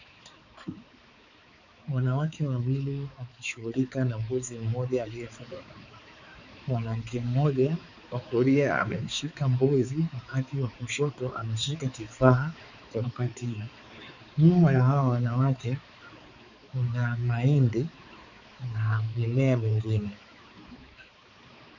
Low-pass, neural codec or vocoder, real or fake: 7.2 kHz; codec, 16 kHz, 4 kbps, X-Codec, HuBERT features, trained on balanced general audio; fake